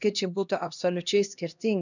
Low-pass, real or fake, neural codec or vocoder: 7.2 kHz; fake; codec, 16 kHz, 1 kbps, X-Codec, HuBERT features, trained on LibriSpeech